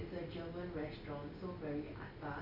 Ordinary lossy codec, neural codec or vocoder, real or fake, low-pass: none; none; real; 5.4 kHz